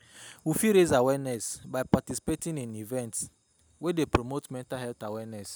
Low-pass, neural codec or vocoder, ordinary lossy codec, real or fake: none; none; none; real